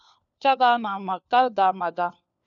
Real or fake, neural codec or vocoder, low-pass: fake; codec, 16 kHz, 4 kbps, FunCodec, trained on LibriTTS, 50 frames a second; 7.2 kHz